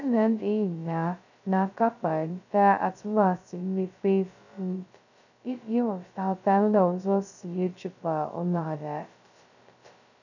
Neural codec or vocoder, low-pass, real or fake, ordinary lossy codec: codec, 16 kHz, 0.2 kbps, FocalCodec; 7.2 kHz; fake; none